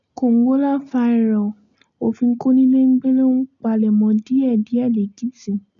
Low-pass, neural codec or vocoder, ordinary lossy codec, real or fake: 7.2 kHz; none; none; real